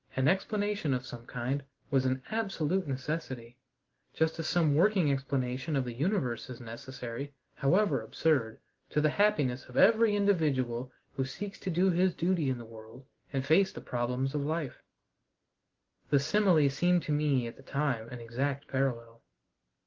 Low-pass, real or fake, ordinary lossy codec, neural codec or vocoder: 7.2 kHz; real; Opus, 16 kbps; none